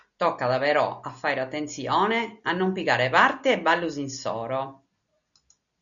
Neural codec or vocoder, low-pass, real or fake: none; 7.2 kHz; real